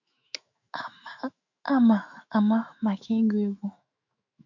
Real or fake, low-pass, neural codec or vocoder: fake; 7.2 kHz; autoencoder, 48 kHz, 128 numbers a frame, DAC-VAE, trained on Japanese speech